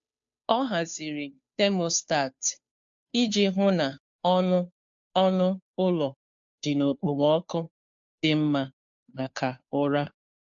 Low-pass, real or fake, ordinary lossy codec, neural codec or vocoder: 7.2 kHz; fake; AAC, 64 kbps; codec, 16 kHz, 2 kbps, FunCodec, trained on Chinese and English, 25 frames a second